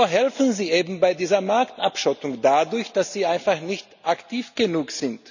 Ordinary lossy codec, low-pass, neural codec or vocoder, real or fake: none; 7.2 kHz; none; real